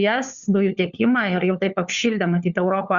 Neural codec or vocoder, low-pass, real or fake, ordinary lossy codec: codec, 16 kHz, 4 kbps, FunCodec, trained on LibriTTS, 50 frames a second; 7.2 kHz; fake; Opus, 64 kbps